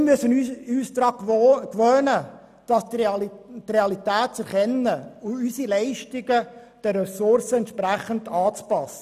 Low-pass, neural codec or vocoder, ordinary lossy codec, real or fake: 14.4 kHz; none; none; real